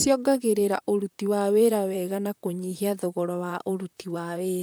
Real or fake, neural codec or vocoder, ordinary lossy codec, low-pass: fake; vocoder, 44.1 kHz, 128 mel bands, Pupu-Vocoder; none; none